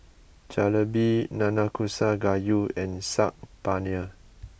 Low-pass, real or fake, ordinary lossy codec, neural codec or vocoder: none; real; none; none